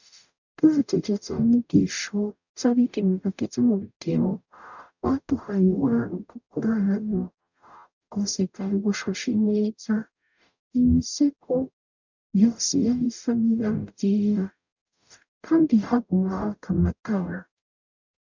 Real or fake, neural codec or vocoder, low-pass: fake; codec, 44.1 kHz, 0.9 kbps, DAC; 7.2 kHz